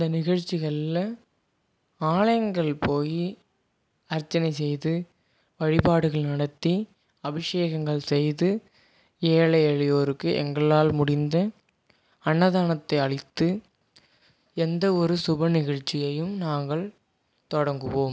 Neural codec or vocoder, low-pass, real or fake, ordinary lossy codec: none; none; real; none